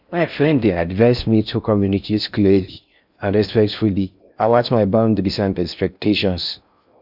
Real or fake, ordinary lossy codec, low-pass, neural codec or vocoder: fake; none; 5.4 kHz; codec, 16 kHz in and 24 kHz out, 0.6 kbps, FocalCodec, streaming, 4096 codes